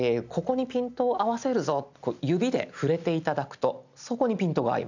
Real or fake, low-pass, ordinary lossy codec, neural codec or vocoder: real; 7.2 kHz; none; none